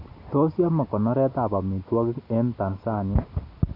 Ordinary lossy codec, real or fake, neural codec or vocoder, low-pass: AAC, 32 kbps; real; none; 5.4 kHz